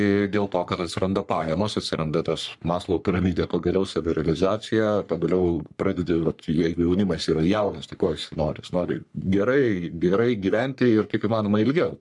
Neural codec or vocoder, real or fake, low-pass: codec, 44.1 kHz, 3.4 kbps, Pupu-Codec; fake; 10.8 kHz